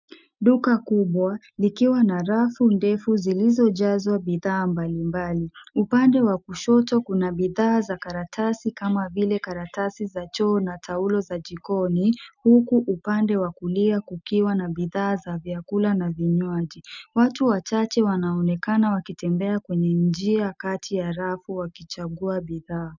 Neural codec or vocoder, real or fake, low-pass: none; real; 7.2 kHz